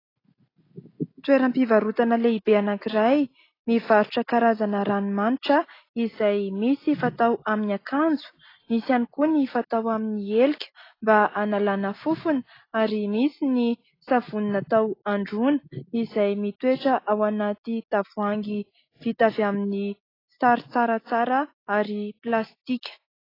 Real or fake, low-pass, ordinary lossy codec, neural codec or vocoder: real; 5.4 kHz; AAC, 24 kbps; none